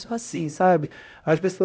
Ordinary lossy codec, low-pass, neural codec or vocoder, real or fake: none; none; codec, 16 kHz, 0.5 kbps, X-Codec, HuBERT features, trained on LibriSpeech; fake